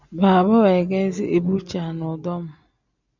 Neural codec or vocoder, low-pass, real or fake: none; 7.2 kHz; real